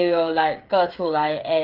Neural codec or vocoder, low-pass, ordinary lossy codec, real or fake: codec, 16 kHz, 16 kbps, FreqCodec, smaller model; 5.4 kHz; Opus, 32 kbps; fake